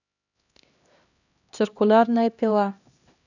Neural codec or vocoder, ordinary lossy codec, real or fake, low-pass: codec, 16 kHz, 1 kbps, X-Codec, HuBERT features, trained on LibriSpeech; none; fake; 7.2 kHz